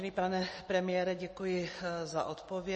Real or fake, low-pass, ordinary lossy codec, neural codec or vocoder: real; 10.8 kHz; MP3, 32 kbps; none